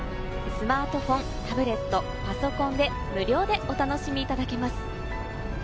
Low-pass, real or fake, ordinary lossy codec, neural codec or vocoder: none; real; none; none